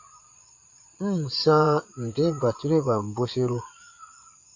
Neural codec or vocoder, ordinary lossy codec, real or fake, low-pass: vocoder, 22.05 kHz, 80 mel bands, Vocos; AAC, 48 kbps; fake; 7.2 kHz